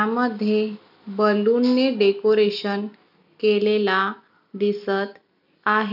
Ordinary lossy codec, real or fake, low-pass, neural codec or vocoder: none; real; 5.4 kHz; none